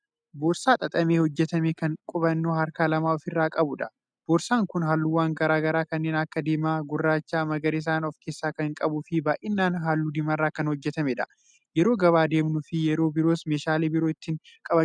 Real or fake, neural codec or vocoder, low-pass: real; none; 9.9 kHz